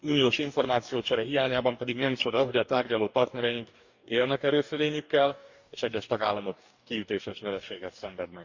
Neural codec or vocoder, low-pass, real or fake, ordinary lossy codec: codec, 44.1 kHz, 2.6 kbps, DAC; 7.2 kHz; fake; Opus, 64 kbps